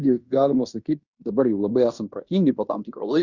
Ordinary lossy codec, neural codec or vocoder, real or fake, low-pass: Opus, 64 kbps; codec, 16 kHz in and 24 kHz out, 0.9 kbps, LongCat-Audio-Codec, fine tuned four codebook decoder; fake; 7.2 kHz